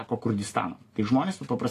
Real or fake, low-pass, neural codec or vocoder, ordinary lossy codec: real; 14.4 kHz; none; AAC, 48 kbps